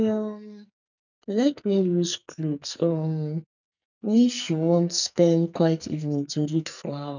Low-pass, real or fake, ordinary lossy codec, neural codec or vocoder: 7.2 kHz; fake; none; codec, 44.1 kHz, 2.6 kbps, SNAC